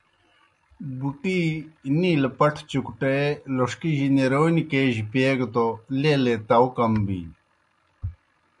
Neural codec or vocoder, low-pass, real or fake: none; 10.8 kHz; real